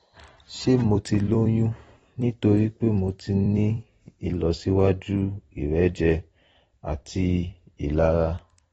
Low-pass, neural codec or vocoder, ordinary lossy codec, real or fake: 19.8 kHz; vocoder, 44.1 kHz, 128 mel bands every 256 samples, BigVGAN v2; AAC, 24 kbps; fake